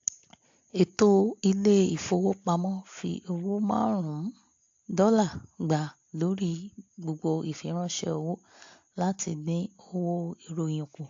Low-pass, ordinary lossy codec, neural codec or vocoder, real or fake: 7.2 kHz; AAC, 48 kbps; none; real